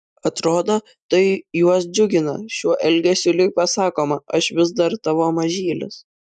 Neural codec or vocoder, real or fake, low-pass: none; real; 9.9 kHz